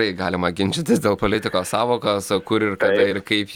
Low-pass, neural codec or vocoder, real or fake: 19.8 kHz; vocoder, 44.1 kHz, 128 mel bands every 256 samples, BigVGAN v2; fake